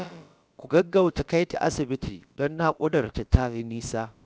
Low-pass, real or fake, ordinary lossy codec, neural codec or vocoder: none; fake; none; codec, 16 kHz, about 1 kbps, DyCAST, with the encoder's durations